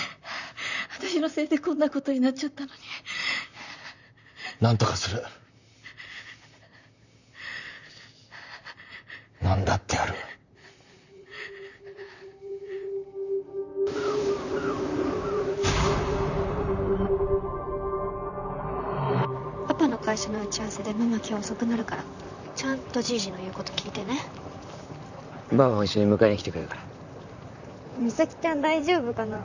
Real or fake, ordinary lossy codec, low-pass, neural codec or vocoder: fake; none; 7.2 kHz; vocoder, 44.1 kHz, 128 mel bands, Pupu-Vocoder